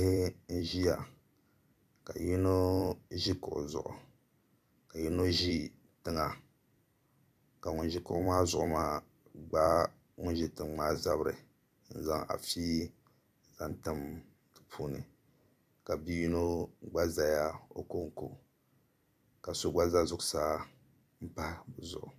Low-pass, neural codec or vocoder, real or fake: 14.4 kHz; vocoder, 44.1 kHz, 128 mel bands every 256 samples, BigVGAN v2; fake